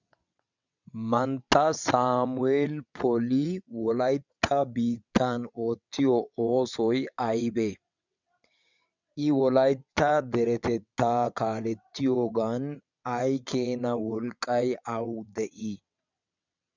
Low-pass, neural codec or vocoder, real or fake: 7.2 kHz; vocoder, 22.05 kHz, 80 mel bands, WaveNeXt; fake